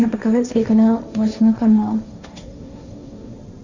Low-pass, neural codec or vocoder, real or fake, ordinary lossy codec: 7.2 kHz; codec, 16 kHz, 1.1 kbps, Voila-Tokenizer; fake; Opus, 64 kbps